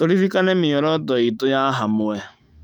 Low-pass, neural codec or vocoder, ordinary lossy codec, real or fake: 19.8 kHz; autoencoder, 48 kHz, 128 numbers a frame, DAC-VAE, trained on Japanese speech; none; fake